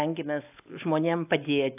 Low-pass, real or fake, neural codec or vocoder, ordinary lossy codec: 3.6 kHz; real; none; AAC, 32 kbps